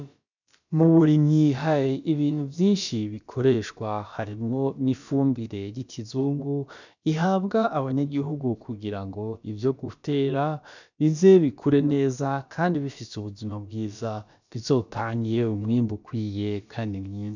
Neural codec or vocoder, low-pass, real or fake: codec, 16 kHz, about 1 kbps, DyCAST, with the encoder's durations; 7.2 kHz; fake